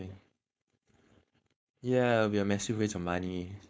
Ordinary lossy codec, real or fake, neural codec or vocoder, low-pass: none; fake; codec, 16 kHz, 4.8 kbps, FACodec; none